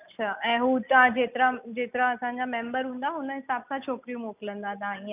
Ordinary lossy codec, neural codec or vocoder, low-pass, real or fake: none; none; 3.6 kHz; real